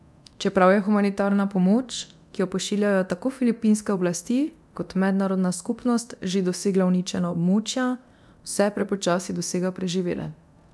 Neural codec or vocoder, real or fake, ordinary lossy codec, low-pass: codec, 24 kHz, 0.9 kbps, DualCodec; fake; none; none